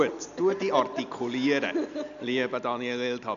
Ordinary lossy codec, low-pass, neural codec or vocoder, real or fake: none; 7.2 kHz; none; real